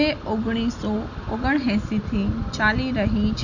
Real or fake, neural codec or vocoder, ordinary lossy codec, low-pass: real; none; none; 7.2 kHz